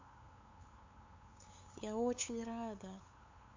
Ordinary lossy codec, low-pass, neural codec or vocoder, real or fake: MP3, 48 kbps; 7.2 kHz; codec, 16 kHz, 8 kbps, FunCodec, trained on LibriTTS, 25 frames a second; fake